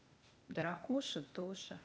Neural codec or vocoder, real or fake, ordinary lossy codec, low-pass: codec, 16 kHz, 0.8 kbps, ZipCodec; fake; none; none